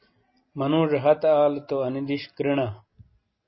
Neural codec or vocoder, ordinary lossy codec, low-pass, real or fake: none; MP3, 24 kbps; 7.2 kHz; real